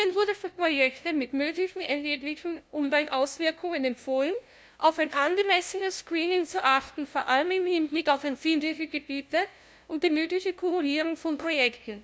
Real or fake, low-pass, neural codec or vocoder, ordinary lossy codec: fake; none; codec, 16 kHz, 0.5 kbps, FunCodec, trained on LibriTTS, 25 frames a second; none